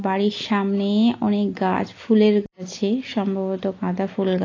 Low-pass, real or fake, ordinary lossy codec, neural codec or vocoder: 7.2 kHz; real; AAC, 32 kbps; none